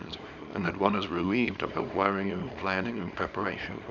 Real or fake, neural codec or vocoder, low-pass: fake; codec, 24 kHz, 0.9 kbps, WavTokenizer, small release; 7.2 kHz